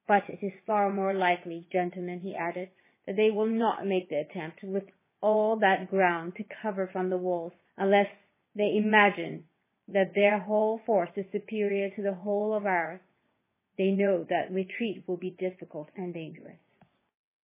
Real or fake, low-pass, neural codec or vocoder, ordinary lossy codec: fake; 3.6 kHz; vocoder, 22.05 kHz, 80 mel bands, WaveNeXt; MP3, 16 kbps